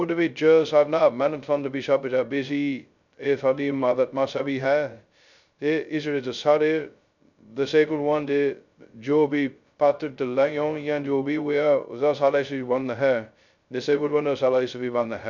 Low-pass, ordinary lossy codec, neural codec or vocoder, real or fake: 7.2 kHz; none; codec, 16 kHz, 0.2 kbps, FocalCodec; fake